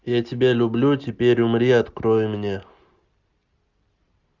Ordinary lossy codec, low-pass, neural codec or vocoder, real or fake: Opus, 64 kbps; 7.2 kHz; none; real